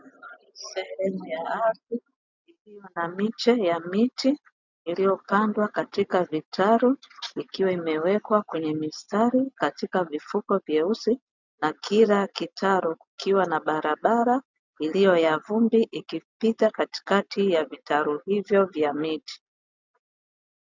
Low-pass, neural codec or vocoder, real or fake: 7.2 kHz; none; real